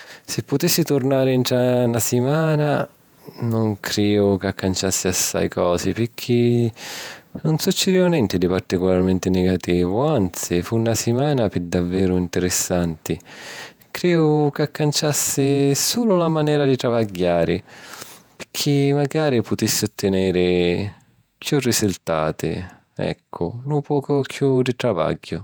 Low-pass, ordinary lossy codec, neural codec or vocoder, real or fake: none; none; vocoder, 48 kHz, 128 mel bands, Vocos; fake